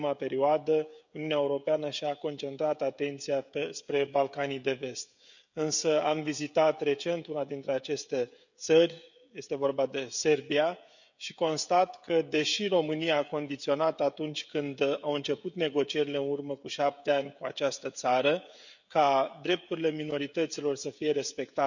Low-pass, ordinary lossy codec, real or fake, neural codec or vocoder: 7.2 kHz; none; fake; codec, 16 kHz, 16 kbps, FreqCodec, smaller model